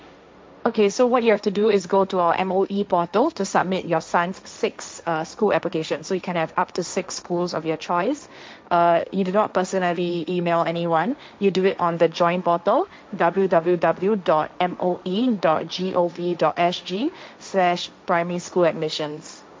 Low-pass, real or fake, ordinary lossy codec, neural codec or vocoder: none; fake; none; codec, 16 kHz, 1.1 kbps, Voila-Tokenizer